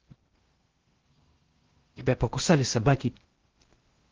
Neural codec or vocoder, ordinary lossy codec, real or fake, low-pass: codec, 16 kHz in and 24 kHz out, 0.6 kbps, FocalCodec, streaming, 2048 codes; Opus, 16 kbps; fake; 7.2 kHz